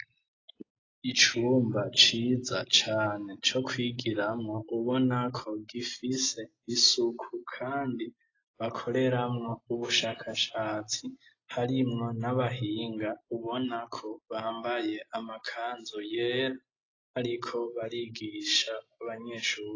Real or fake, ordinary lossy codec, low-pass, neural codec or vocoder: real; AAC, 32 kbps; 7.2 kHz; none